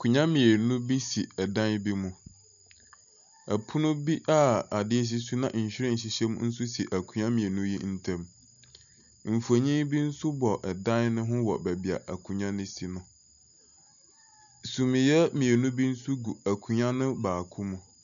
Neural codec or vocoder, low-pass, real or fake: none; 7.2 kHz; real